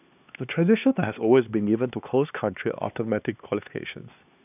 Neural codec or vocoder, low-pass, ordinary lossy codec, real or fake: codec, 16 kHz, 2 kbps, X-Codec, HuBERT features, trained on LibriSpeech; 3.6 kHz; none; fake